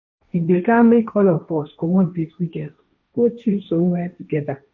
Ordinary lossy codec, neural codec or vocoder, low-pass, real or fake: none; codec, 16 kHz, 1.1 kbps, Voila-Tokenizer; 7.2 kHz; fake